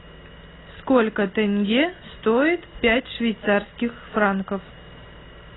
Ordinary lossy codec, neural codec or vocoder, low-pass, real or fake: AAC, 16 kbps; none; 7.2 kHz; real